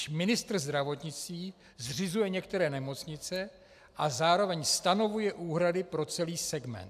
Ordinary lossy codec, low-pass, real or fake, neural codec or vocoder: AAC, 96 kbps; 14.4 kHz; real; none